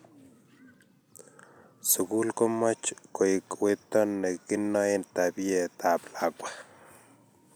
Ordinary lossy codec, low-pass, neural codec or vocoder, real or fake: none; none; none; real